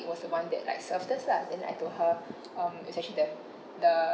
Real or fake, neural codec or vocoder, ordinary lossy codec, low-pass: real; none; none; none